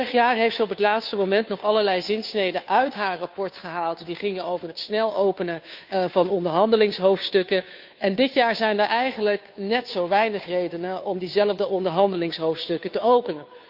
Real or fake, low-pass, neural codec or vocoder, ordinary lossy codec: fake; 5.4 kHz; codec, 16 kHz, 2 kbps, FunCodec, trained on Chinese and English, 25 frames a second; AAC, 48 kbps